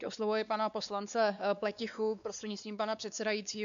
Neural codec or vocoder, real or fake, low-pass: codec, 16 kHz, 2 kbps, X-Codec, WavLM features, trained on Multilingual LibriSpeech; fake; 7.2 kHz